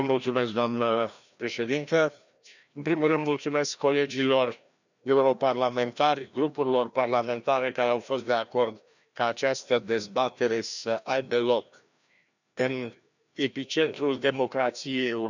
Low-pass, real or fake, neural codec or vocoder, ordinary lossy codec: 7.2 kHz; fake; codec, 16 kHz, 1 kbps, FreqCodec, larger model; none